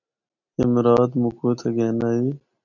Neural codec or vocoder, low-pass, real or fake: none; 7.2 kHz; real